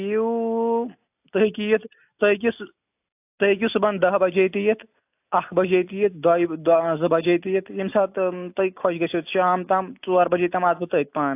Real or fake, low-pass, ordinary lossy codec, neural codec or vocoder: real; 3.6 kHz; AAC, 32 kbps; none